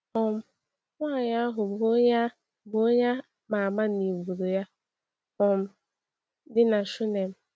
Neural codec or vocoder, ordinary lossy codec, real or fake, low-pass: none; none; real; none